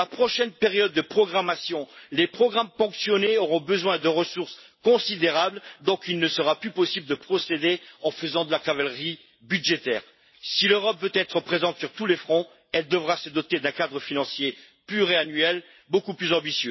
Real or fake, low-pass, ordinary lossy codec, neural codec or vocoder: real; 7.2 kHz; MP3, 24 kbps; none